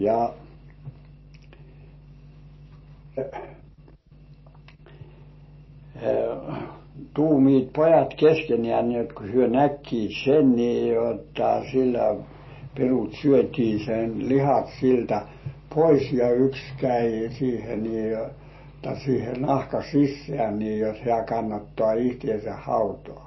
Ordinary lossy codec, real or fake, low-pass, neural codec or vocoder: MP3, 24 kbps; real; 7.2 kHz; none